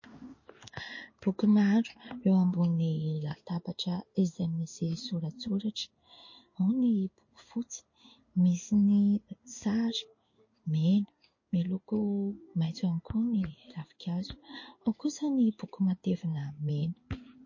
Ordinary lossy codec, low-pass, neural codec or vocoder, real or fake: MP3, 32 kbps; 7.2 kHz; codec, 16 kHz in and 24 kHz out, 1 kbps, XY-Tokenizer; fake